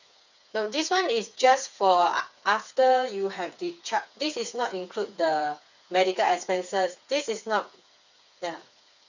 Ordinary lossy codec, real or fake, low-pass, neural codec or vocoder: none; fake; 7.2 kHz; codec, 16 kHz, 4 kbps, FreqCodec, smaller model